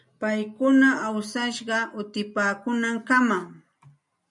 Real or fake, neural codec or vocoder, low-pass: real; none; 10.8 kHz